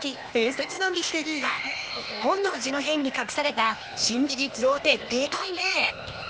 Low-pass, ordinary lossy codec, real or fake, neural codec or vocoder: none; none; fake; codec, 16 kHz, 0.8 kbps, ZipCodec